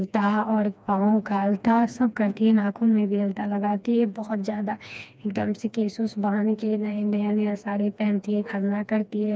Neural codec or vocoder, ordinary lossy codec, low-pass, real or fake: codec, 16 kHz, 2 kbps, FreqCodec, smaller model; none; none; fake